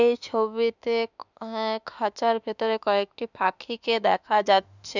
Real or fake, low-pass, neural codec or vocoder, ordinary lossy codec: fake; 7.2 kHz; autoencoder, 48 kHz, 32 numbers a frame, DAC-VAE, trained on Japanese speech; none